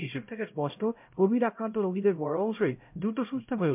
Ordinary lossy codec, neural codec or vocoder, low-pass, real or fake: MP3, 32 kbps; codec, 16 kHz, 0.5 kbps, X-Codec, HuBERT features, trained on LibriSpeech; 3.6 kHz; fake